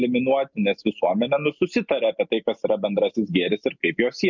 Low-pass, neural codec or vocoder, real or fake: 7.2 kHz; none; real